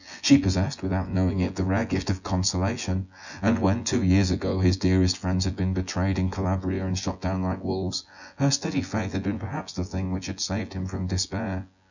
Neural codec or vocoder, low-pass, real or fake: vocoder, 24 kHz, 100 mel bands, Vocos; 7.2 kHz; fake